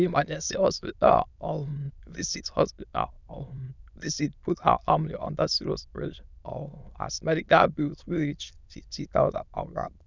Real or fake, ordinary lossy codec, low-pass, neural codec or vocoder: fake; none; 7.2 kHz; autoencoder, 22.05 kHz, a latent of 192 numbers a frame, VITS, trained on many speakers